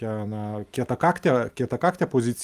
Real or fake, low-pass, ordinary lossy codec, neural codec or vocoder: real; 14.4 kHz; Opus, 32 kbps; none